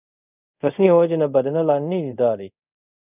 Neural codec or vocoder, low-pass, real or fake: codec, 24 kHz, 0.5 kbps, DualCodec; 3.6 kHz; fake